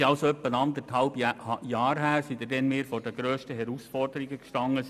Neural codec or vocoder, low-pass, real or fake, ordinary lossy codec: none; 14.4 kHz; real; none